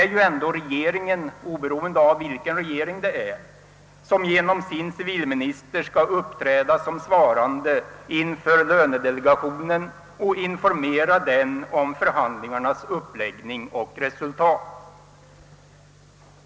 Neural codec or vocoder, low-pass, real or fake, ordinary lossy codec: none; none; real; none